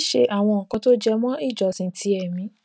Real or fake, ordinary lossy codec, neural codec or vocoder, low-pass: real; none; none; none